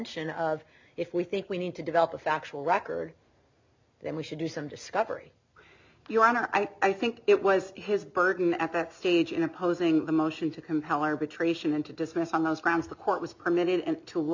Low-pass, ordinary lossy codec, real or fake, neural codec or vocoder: 7.2 kHz; MP3, 64 kbps; real; none